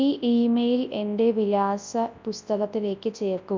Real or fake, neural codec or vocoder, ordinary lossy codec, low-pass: fake; codec, 24 kHz, 0.9 kbps, WavTokenizer, large speech release; MP3, 64 kbps; 7.2 kHz